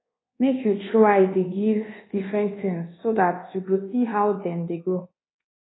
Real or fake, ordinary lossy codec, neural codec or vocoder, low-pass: fake; AAC, 16 kbps; codec, 24 kHz, 1.2 kbps, DualCodec; 7.2 kHz